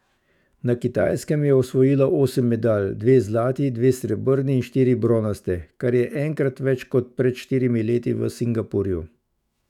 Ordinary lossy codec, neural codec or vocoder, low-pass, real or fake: none; autoencoder, 48 kHz, 128 numbers a frame, DAC-VAE, trained on Japanese speech; 19.8 kHz; fake